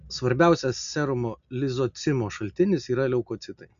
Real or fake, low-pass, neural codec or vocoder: real; 7.2 kHz; none